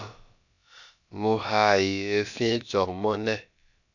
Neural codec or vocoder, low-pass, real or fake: codec, 16 kHz, about 1 kbps, DyCAST, with the encoder's durations; 7.2 kHz; fake